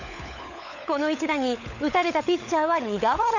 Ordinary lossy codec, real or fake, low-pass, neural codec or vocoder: none; fake; 7.2 kHz; codec, 16 kHz, 16 kbps, FunCodec, trained on LibriTTS, 50 frames a second